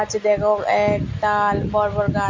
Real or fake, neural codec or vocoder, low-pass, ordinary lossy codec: real; none; 7.2 kHz; MP3, 48 kbps